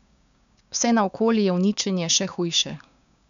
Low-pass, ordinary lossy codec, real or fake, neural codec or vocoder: 7.2 kHz; none; fake; codec, 16 kHz, 6 kbps, DAC